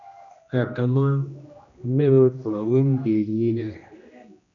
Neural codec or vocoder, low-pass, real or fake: codec, 16 kHz, 1 kbps, X-Codec, HuBERT features, trained on general audio; 7.2 kHz; fake